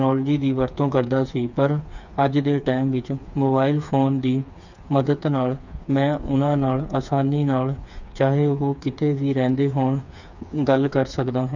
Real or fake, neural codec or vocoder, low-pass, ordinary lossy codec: fake; codec, 16 kHz, 4 kbps, FreqCodec, smaller model; 7.2 kHz; none